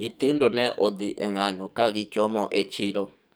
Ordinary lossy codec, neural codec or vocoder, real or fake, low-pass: none; codec, 44.1 kHz, 2.6 kbps, SNAC; fake; none